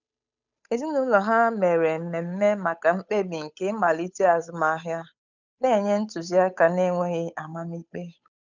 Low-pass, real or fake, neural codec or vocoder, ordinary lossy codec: 7.2 kHz; fake; codec, 16 kHz, 8 kbps, FunCodec, trained on Chinese and English, 25 frames a second; none